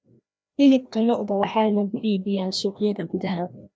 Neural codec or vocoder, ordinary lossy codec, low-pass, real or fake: codec, 16 kHz, 1 kbps, FreqCodec, larger model; none; none; fake